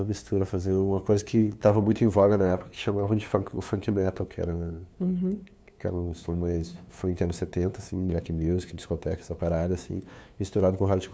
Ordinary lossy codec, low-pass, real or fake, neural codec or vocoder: none; none; fake; codec, 16 kHz, 2 kbps, FunCodec, trained on LibriTTS, 25 frames a second